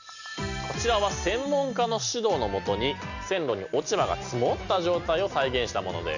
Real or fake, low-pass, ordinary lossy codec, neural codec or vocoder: real; 7.2 kHz; none; none